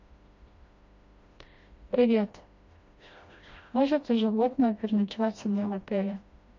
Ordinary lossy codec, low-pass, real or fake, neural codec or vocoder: MP3, 48 kbps; 7.2 kHz; fake; codec, 16 kHz, 1 kbps, FreqCodec, smaller model